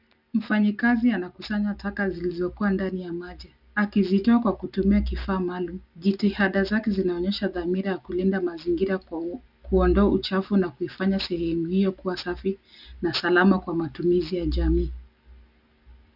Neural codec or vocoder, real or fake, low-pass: none; real; 5.4 kHz